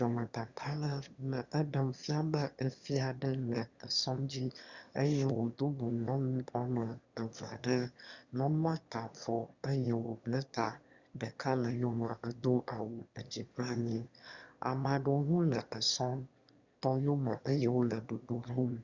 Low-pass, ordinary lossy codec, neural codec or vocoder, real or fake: 7.2 kHz; Opus, 64 kbps; autoencoder, 22.05 kHz, a latent of 192 numbers a frame, VITS, trained on one speaker; fake